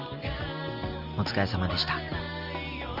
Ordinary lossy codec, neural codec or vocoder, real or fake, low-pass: Opus, 32 kbps; none; real; 5.4 kHz